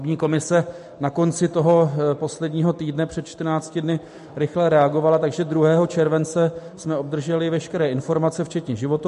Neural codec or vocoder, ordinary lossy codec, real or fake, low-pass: none; MP3, 48 kbps; real; 14.4 kHz